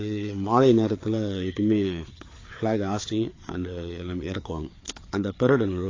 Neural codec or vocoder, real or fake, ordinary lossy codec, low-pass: codec, 16 kHz, 4 kbps, FreqCodec, larger model; fake; AAC, 32 kbps; 7.2 kHz